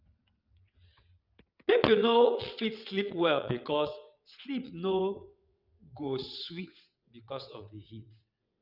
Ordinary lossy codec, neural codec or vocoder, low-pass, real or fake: none; vocoder, 22.05 kHz, 80 mel bands, WaveNeXt; 5.4 kHz; fake